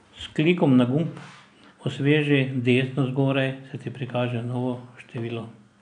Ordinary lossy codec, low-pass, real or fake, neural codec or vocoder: none; 9.9 kHz; real; none